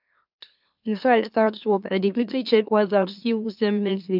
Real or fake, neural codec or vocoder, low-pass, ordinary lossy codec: fake; autoencoder, 44.1 kHz, a latent of 192 numbers a frame, MeloTTS; 5.4 kHz; none